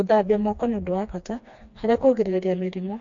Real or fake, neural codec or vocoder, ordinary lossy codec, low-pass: fake; codec, 16 kHz, 2 kbps, FreqCodec, smaller model; MP3, 48 kbps; 7.2 kHz